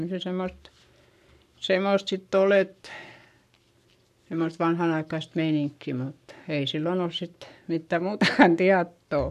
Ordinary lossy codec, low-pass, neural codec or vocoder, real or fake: none; 14.4 kHz; codec, 44.1 kHz, 7.8 kbps, Pupu-Codec; fake